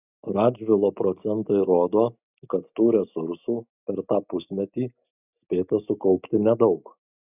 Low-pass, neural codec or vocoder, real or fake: 3.6 kHz; none; real